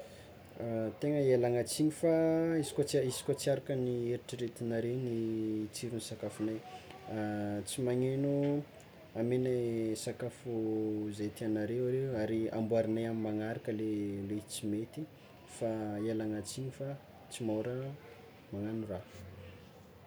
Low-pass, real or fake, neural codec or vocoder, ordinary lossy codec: none; real; none; none